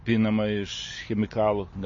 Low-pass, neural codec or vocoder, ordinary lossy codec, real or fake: 7.2 kHz; none; MP3, 32 kbps; real